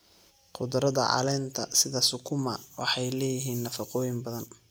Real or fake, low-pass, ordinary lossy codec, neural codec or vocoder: real; none; none; none